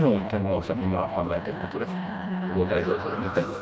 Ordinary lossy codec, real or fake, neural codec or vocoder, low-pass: none; fake; codec, 16 kHz, 1 kbps, FreqCodec, smaller model; none